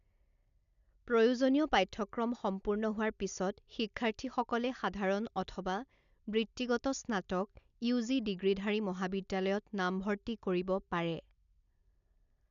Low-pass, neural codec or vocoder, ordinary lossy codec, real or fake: 7.2 kHz; none; none; real